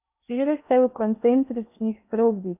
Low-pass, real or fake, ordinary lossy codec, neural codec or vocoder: 3.6 kHz; fake; none; codec, 16 kHz in and 24 kHz out, 0.6 kbps, FocalCodec, streaming, 2048 codes